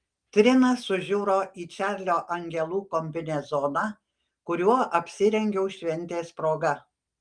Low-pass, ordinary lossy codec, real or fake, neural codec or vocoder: 9.9 kHz; Opus, 32 kbps; real; none